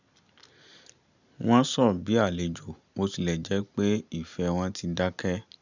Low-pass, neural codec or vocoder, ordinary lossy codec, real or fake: 7.2 kHz; none; none; real